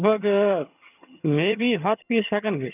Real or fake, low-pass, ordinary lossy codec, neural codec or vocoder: fake; 3.6 kHz; none; vocoder, 22.05 kHz, 80 mel bands, HiFi-GAN